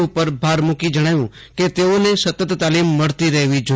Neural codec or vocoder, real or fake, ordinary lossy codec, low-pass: none; real; none; none